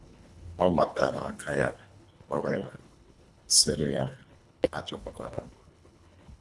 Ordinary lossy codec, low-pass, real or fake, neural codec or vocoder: none; none; fake; codec, 24 kHz, 1.5 kbps, HILCodec